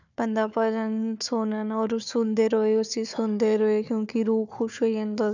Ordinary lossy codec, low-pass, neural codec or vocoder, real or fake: none; 7.2 kHz; codec, 16 kHz, 4 kbps, FunCodec, trained on Chinese and English, 50 frames a second; fake